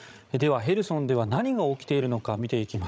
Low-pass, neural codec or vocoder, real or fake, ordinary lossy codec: none; codec, 16 kHz, 16 kbps, FreqCodec, larger model; fake; none